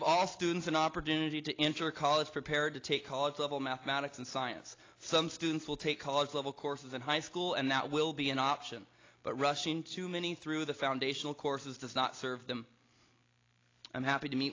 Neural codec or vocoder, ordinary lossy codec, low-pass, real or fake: none; AAC, 32 kbps; 7.2 kHz; real